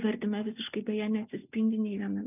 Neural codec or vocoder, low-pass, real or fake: none; 3.6 kHz; real